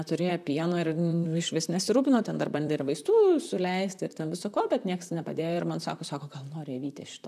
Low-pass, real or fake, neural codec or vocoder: 14.4 kHz; fake; vocoder, 44.1 kHz, 128 mel bands, Pupu-Vocoder